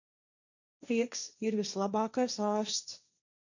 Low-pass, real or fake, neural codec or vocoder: 7.2 kHz; fake; codec, 16 kHz, 1.1 kbps, Voila-Tokenizer